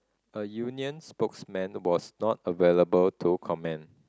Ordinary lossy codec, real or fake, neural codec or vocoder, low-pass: none; real; none; none